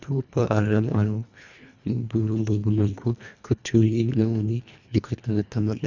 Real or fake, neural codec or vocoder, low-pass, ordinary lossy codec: fake; codec, 24 kHz, 1.5 kbps, HILCodec; 7.2 kHz; none